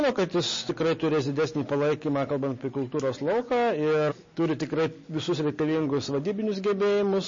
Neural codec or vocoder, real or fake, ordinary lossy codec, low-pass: none; real; MP3, 32 kbps; 7.2 kHz